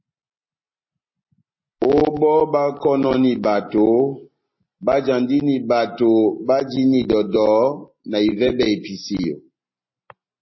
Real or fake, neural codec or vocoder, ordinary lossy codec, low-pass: real; none; MP3, 24 kbps; 7.2 kHz